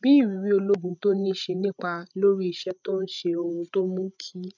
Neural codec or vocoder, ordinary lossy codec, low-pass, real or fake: codec, 16 kHz, 16 kbps, FreqCodec, larger model; none; 7.2 kHz; fake